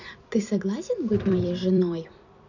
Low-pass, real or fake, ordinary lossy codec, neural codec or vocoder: 7.2 kHz; fake; none; vocoder, 44.1 kHz, 128 mel bands every 256 samples, BigVGAN v2